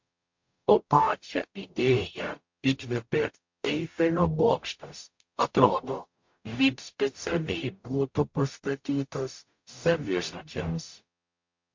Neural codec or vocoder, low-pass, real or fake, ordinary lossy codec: codec, 44.1 kHz, 0.9 kbps, DAC; 7.2 kHz; fake; MP3, 48 kbps